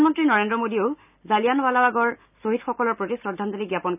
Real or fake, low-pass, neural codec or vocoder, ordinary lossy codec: real; 3.6 kHz; none; none